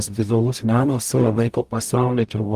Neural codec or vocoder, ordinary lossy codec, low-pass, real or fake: codec, 44.1 kHz, 0.9 kbps, DAC; Opus, 32 kbps; 14.4 kHz; fake